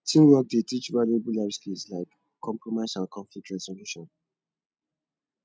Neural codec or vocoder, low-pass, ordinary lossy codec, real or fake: none; none; none; real